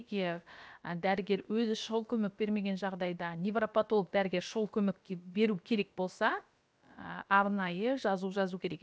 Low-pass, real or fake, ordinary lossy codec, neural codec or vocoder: none; fake; none; codec, 16 kHz, about 1 kbps, DyCAST, with the encoder's durations